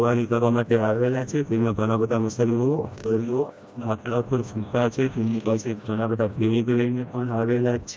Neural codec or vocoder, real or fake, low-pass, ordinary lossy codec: codec, 16 kHz, 1 kbps, FreqCodec, smaller model; fake; none; none